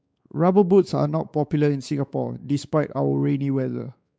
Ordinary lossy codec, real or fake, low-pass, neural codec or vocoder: none; fake; none; codec, 16 kHz, 4 kbps, X-Codec, WavLM features, trained on Multilingual LibriSpeech